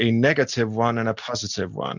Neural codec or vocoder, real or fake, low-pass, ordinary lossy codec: none; real; 7.2 kHz; Opus, 64 kbps